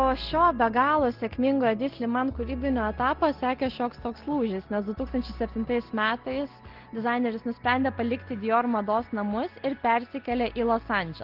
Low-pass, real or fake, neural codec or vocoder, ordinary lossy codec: 5.4 kHz; real; none; Opus, 16 kbps